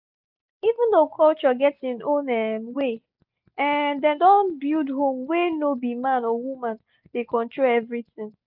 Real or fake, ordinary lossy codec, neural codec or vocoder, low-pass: real; none; none; 5.4 kHz